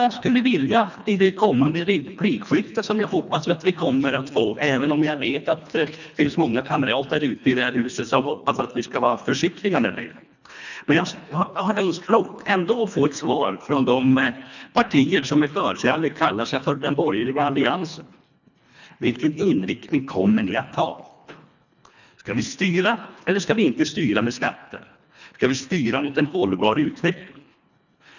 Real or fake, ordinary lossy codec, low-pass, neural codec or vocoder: fake; none; 7.2 kHz; codec, 24 kHz, 1.5 kbps, HILCodec